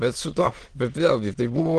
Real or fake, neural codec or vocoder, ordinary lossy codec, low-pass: fake; autoencoder, 22.05 kHz, a latent of 192 numbers a frame, VITS, trained on many speakers; Opus, 16 kbps; 9.9 kHz